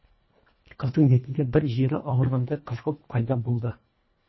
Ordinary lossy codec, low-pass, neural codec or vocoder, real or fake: MP3, 24 kbps; 7.2 kHz; codec, 24 kHz, 1.5 kbps, HILCodec; fake